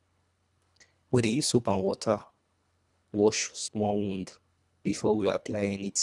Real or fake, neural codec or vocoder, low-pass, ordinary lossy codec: fake; codec, 24 kHz, 1.5 kbps, HILCodec; none; none